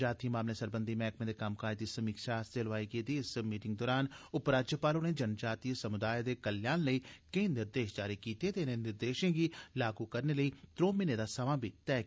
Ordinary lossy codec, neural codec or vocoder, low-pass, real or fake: none; none; none; real